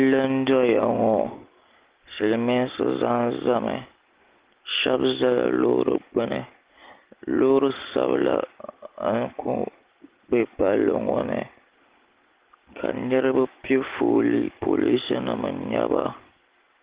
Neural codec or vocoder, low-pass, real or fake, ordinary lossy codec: none; 3.6 kHz; real; Opus, 16 kbps